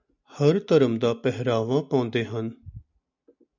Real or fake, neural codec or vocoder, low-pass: real; none; 7.2 kHz